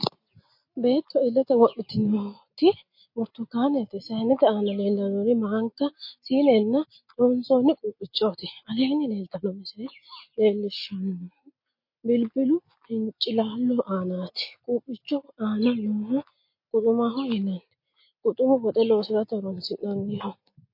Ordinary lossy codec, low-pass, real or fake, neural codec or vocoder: MP3, 32 kbps; 5.4 kHz; real; none